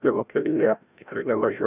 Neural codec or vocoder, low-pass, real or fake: codec, 16 kHz, 0.5 kbps, FreqCodec, larger model; 3.6 kHz; fake